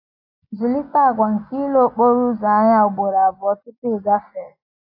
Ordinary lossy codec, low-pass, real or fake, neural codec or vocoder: AAC, 48 kbps; 5.4 kHz; real; none